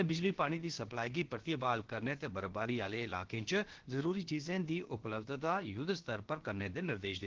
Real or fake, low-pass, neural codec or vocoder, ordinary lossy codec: fake; 7.2 kHz; codec, 16 kHz, about 1 kbps, DyCAST, with the encoder's durations; Opus, 16 kbps